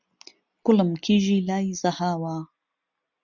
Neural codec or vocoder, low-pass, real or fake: none; 7.2 kHz; real